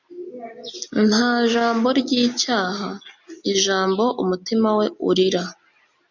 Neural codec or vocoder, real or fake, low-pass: none; real; 7.2 kHz